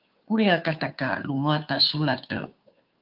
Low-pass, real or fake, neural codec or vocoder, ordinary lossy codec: 5.4 kHz; fake; codec, 16 kHz, 2 kbps, FunCodec, trained on Chinese and English, 25 frames a second; Opus, 24 kbps